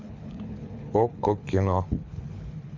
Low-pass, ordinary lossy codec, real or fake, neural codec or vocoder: 7.2 kHz; MP3, 64 kbps; fake; codec, 24 kHz, 6 kbps, HILCodec